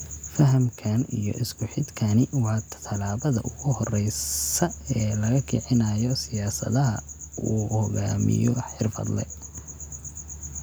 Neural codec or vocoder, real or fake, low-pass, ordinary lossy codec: none; real; none; none